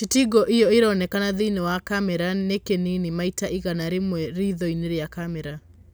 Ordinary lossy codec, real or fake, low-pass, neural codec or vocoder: none; real; none; none